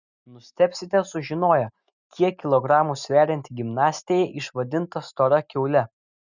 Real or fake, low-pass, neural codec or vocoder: real; 7.2 kHz; none